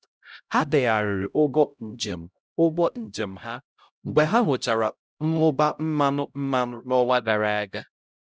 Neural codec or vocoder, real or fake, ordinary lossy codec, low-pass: codec, 16 kHz, 0.5 kbps, X-Codec, HuBERT features, trained on LibriSpeech; fake; none; none